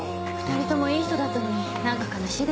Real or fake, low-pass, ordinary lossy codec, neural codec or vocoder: real; none; none; none